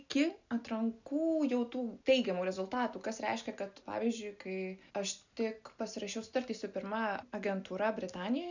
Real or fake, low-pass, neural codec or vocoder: real; 7.2 kHz; none